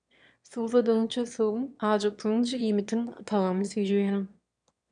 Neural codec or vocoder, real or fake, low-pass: autoencoder, 22.05 kHz, a latent of 192 numbers a frame, VITS, trained on one speaker; fake; 9.9 kHz